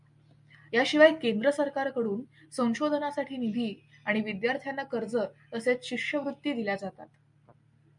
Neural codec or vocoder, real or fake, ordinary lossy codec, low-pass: none; real; MP3, 96 kbps; 10.8 kHz